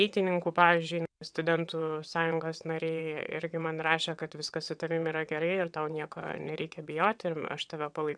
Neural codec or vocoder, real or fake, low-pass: vocoder, 22.05 kHz, 80 mel bands, WaveNeXt; fake; 9.9 kHz